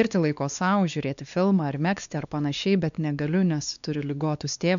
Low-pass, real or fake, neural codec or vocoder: 7.2 kHz; fake; codec, 16 kHz, 2 kbps, X-Codec, WavLM features, trained on Multilingual LibriSpeech